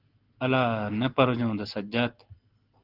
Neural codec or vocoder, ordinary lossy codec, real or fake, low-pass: none; Opus, 16 kbps; real; 5.4 kHz